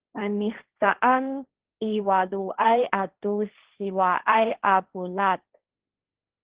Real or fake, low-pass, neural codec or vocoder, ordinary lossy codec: fake; 3.6 kHz; codec, 16 kHz, 1.1 kbps, Voila-Tokenizer; Opus, 16 kbps